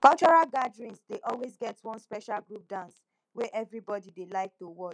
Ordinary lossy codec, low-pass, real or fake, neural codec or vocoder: none; 9.9 kHz; real; none